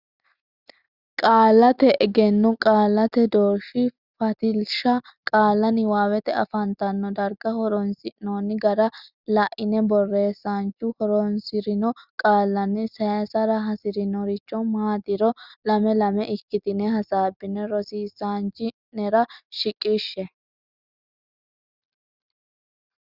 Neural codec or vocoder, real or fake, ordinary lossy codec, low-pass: none; real; Opus, 64 kbps; 5.4 kHz